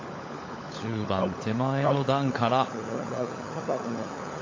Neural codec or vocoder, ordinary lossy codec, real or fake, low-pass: codec, 16 kHz, 16 kbps, FunCodec, trained on LibriTTS, 50 frames a second; AAC, 32 kbps; fake; 7.2 kHz